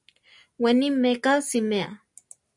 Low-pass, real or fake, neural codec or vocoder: 10.8 kHz; real; none